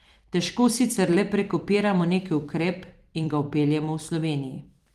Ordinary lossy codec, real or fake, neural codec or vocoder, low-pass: Opus, 24 kbps; fake; vocoder, 44.1 kHz, 128 mel bands every 256 samples, BigVGAN v2; 14.4 kHz